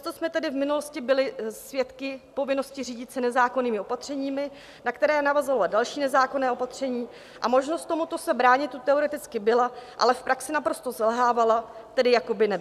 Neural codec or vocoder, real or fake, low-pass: none; real; 14.4 kHz